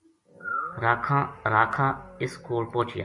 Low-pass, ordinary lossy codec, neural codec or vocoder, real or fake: 10.8 kHz; MP3, 48 kbps; none; real